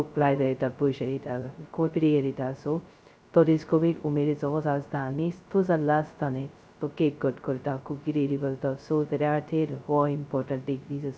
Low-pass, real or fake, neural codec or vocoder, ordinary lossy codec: none; fake; codec, 16 kHz, 0.2 kbps, FocalCodec; none